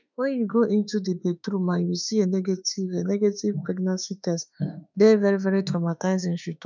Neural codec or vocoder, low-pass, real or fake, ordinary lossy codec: autoencoder, 48 kHz, 32 numbers a frame, DAC-VAE, trained on Japanese speech; 7.2 kHz; fake; none